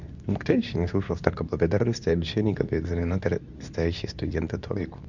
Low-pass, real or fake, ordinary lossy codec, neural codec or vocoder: 7.2 kHz; fake; none; codec, 24 kHz, 0.9 kbps, WavTokenizer, medium speech release version 2